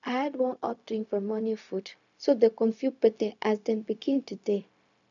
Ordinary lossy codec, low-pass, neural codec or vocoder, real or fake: none; 7.2 kHz; codec, 16 kHz, 0.4 kbps, LongCat-Audio-Codec; fake